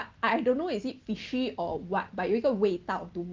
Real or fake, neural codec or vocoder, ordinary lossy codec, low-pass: real; none; Opus, 32 kbps; 7.2 kHz